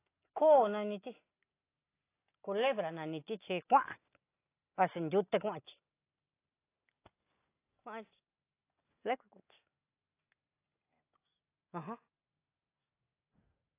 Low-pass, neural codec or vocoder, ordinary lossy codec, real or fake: 3.6 kHz; none; AAC, 24 kbps; real